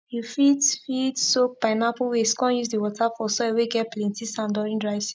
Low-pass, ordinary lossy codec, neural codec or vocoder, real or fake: none; none; none; real